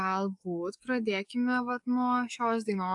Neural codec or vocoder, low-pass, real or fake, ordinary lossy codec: codec, 44.1 kHz, 7.8 kbps, DAC; 10.8 kHz; fake; AAC, 64 kbps